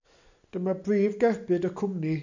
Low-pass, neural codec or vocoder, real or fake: 7.2 kHz; none; real